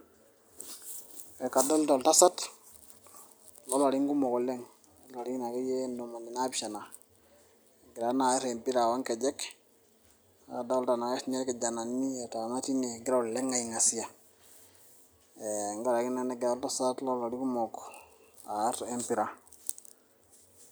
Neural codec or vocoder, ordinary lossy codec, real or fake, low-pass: none; none; real; none